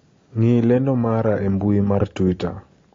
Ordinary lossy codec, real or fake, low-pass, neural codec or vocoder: AAC, 32 kbps; real; 7.2 kHz; none